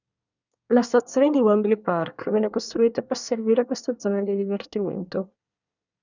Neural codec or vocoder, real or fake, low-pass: codec, 24 kHz, 1 kbps, SNAC; fake; 7.2 kHz